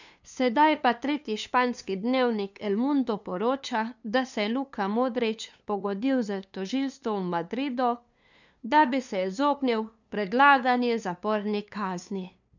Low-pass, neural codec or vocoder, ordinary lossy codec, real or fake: 7.2 kHz; codec, 16 kHz, 2 kbps, FunCodec, trained on LibriTTS, 25 frames a second; none; fake